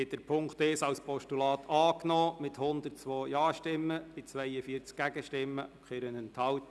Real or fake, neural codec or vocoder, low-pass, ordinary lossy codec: real; none; none; none